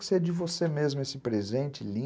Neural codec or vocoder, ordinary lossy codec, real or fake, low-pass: none; none; real; none